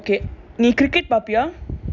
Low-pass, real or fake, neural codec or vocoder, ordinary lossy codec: 7.2 kHz; real; none; none